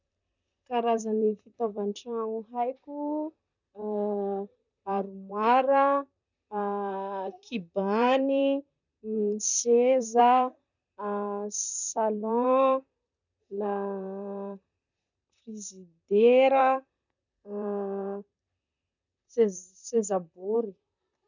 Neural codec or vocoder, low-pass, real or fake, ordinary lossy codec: vocoder, 44.1 kHz, 128 mel bands, Pupu-Vocoder; 7.2 kHz; fake; none